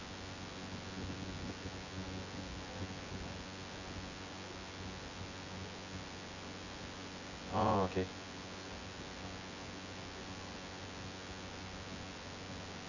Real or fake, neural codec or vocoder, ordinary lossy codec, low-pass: fake; vocoder, 24 kHz, 100 mel bands, Vocos; none; 7.2 kHz